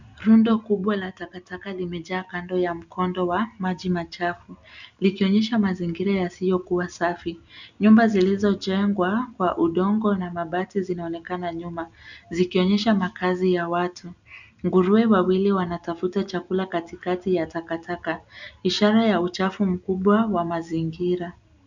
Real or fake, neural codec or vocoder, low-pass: real; none; 7.2 kHz